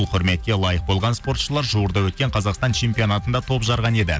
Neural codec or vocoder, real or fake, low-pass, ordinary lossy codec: none; real; none; none